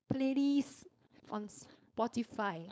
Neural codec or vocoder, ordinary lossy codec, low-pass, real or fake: codec, 16 kHz, 4.8 kbps, FACodec; none; none; fake